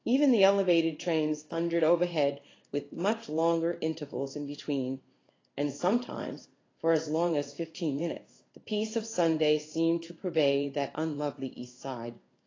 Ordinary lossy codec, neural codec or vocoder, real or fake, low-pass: AAC, 32 kbps; codec, 16 kHz in and 24 kHz out, 1 kbps, XY-Tokenizer; fake; 7.2 kHz